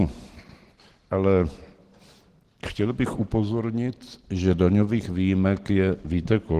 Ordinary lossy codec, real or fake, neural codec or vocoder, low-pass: Opus, 16 kbps; real; none; 14.4 kHz